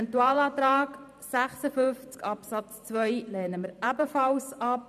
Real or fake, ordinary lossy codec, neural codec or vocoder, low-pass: fake; none; vocoder, 44.1 kHz, 128 mel bands every 512 samples, BigVGAN v2; 14.4 kHz